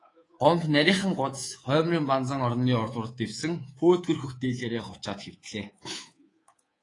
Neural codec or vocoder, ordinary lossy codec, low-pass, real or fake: codec, 24 kHz, 3.1 kbps, DualCodec; AAC, 32 kbps; 10.8 kHz; fake